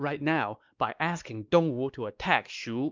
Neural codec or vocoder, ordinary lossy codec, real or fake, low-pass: codec, 16 kHz, 2 kbps, X-Codec, WavLM features, trained on Multilingual LibriSpeech; Opus, 24 kbps; fake; 7.2 kHz